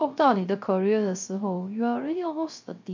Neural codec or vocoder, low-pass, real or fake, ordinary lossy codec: codec, 16 kHz, 0.3 kbps, FocalCodec; 7.2 kHz; fake; MP3, 48 kbps